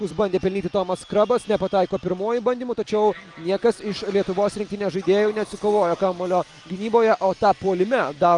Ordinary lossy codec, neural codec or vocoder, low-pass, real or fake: Opus, 32 kbps; none; 10.8 kHz; real